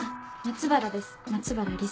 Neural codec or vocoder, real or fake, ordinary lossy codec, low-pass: none; real; none; none